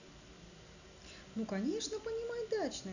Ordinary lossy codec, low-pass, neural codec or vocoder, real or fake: none; 7.2 kHz; none; real